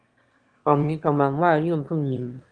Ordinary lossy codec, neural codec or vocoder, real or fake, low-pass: Opus, 32 kbps; autoencoder, 22.05 kHz, a latent of 192 numbers a frame, VITS, trained on one speaker; fake; 9.9 kHz